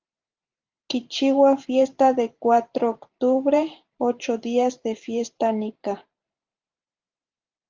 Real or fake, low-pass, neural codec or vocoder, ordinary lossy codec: fake; 7.2 kHz; vocoder, 44.1 kHz, 128 mel bands every 512 samples, BigVGAN v2; Opus, 24 kbps